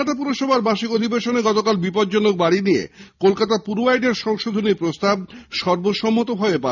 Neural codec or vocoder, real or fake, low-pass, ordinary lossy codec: none; real; 7.2 kHz; none